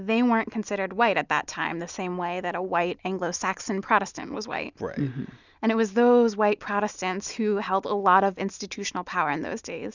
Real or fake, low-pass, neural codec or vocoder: real; 7.2 kHz; none